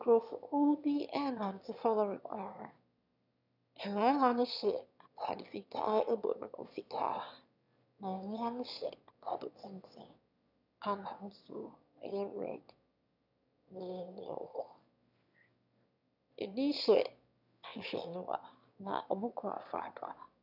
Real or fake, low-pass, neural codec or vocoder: fake; 5.4 kHz; autoencoder, 22.05 kHz, a latent of 192 numbers a frame, VITS, trained on one speaker